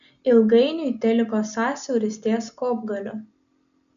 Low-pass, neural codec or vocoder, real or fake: 7.2 kHz; none; real